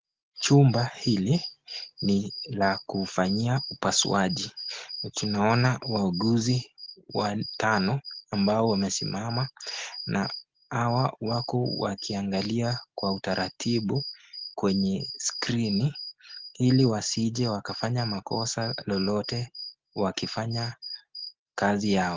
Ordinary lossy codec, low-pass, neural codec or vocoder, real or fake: Opus, 16 kbps; 7.2 kHz; none; real